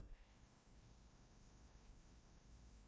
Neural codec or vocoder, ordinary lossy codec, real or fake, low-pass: codec, 16 kHz, 1 kbps, FreqCodec, larger model; none; fake; none